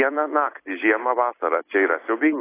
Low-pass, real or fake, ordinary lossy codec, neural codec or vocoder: 3.6 kHz; fake; AAC, 24 kbps; vocoder, 44.1 kHz, 128 mel bands every 256 samples, BigVGAN v2